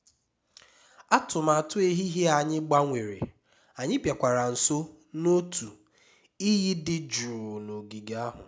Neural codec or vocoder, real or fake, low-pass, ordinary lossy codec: none; real; none; none